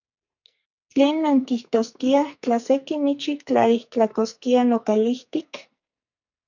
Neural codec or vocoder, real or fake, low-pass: codec, 44.1 kHz, 2.6 kbps, SNAC; fake; 7.2 kHz